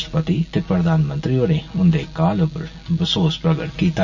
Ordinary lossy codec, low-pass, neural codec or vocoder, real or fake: none; 7.2 kHz; none; real